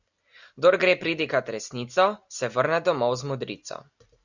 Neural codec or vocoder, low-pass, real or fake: none; 7.2 kHz; real